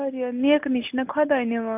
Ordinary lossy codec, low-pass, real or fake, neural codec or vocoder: AAC, 32 kbps; 3.6 kHz; real; none